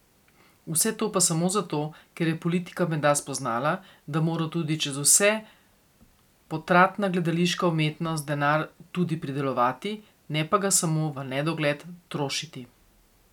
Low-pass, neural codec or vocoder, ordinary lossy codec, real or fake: 19.8 kHz; none; none; real